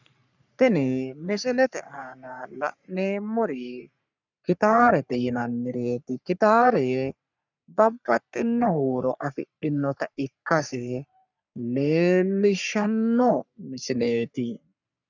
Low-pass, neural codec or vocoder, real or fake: 7.2 kHz; codec, 44.1 kHz, 3.4 kbps, Pupu-Codec; fake